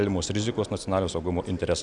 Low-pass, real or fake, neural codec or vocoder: 10.8 kHz; real; none